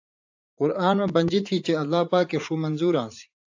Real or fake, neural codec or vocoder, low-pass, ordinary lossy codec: fake; vocoder, 44.1 kHz, 80 mel bands, Vocos; 7.2 kHz; AAC, 48 kbps